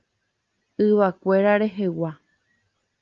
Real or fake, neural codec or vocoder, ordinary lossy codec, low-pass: real; none; Opus, 32 kbps; 7.2 kHz